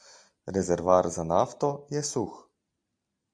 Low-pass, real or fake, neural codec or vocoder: 9.9 kHz; real; none